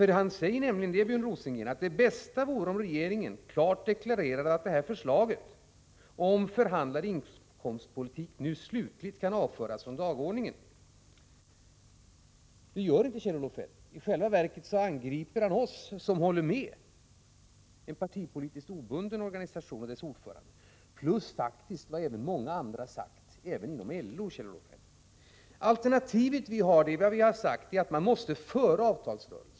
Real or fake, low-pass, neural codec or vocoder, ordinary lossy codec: real; none; none; none